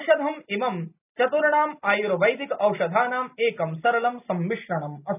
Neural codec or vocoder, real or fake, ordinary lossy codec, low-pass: none; real; none; 3.6 kHz